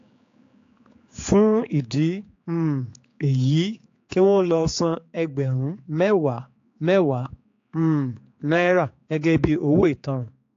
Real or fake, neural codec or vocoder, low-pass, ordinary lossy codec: fake; codec, 16 kHz, 4 kbps, X-Codec, HuBERT features, trained on general audio; 7.2 kHz; AAC, 48 kbps